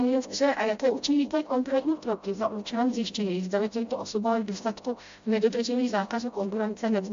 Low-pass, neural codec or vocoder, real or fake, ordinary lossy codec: 7.2 kHz; codec, 16 kHz, 0.5 kbps, FreqCodec, smaller model; fake; AAC, 64 kbps